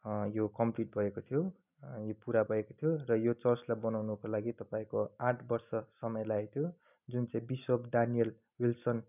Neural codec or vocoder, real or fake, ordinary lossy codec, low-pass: none; real; none; 3.6 kHz